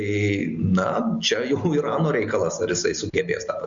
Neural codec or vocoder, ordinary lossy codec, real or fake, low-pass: none; Opus, 64 kbps; real; 7.2 kHz